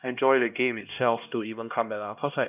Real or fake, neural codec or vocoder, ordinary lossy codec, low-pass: fake; codec, 16 kHz, 1 kbps, X-Codec, HuBERT features, trained on LibriSpeech; none; 3.6 kHz